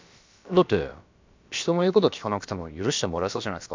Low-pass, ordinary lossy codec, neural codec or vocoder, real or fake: 7.2 kHz; MP3, 64 kbps; codec, 16 kHz, about 1 kbps, DyCAST, with the encoder's durations; fake